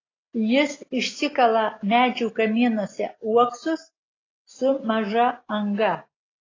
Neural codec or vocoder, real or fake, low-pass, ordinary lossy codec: none; real; 7.2 kHz; AAC, 32 kbps